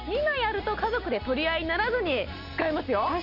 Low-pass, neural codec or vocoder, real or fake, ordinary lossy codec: 5.4 kHz; none; real; none